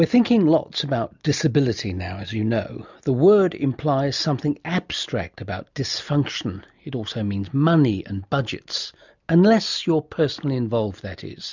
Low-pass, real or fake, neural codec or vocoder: 7.2 kHz; real; none